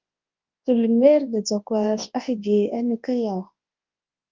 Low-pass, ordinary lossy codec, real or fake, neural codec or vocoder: 7.2 kHz; Opus, 24 kbps; fake; codec, 24 kHz, 0.9 kbps, WavTokenizer, large speech release